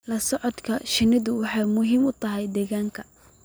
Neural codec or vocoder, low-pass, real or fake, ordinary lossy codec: none; none; real; none